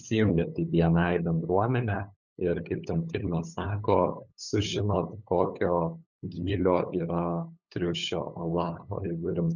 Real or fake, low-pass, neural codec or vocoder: fake; 7.2 kHz; codec, 16 kHz, 8 kbps, FunCodec, trained on LibriTTS, 25 frames a second